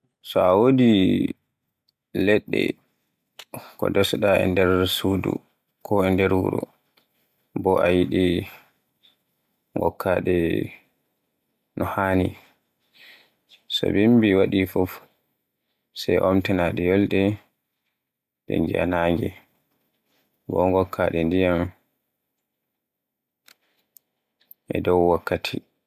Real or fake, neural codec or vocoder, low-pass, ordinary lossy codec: real; none; 14.4 kHz; MP3, 96 kbps